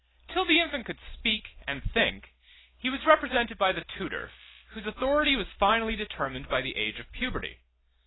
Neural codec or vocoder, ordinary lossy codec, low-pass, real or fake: none; AAC, 16 kbps; 7.2 kHz; real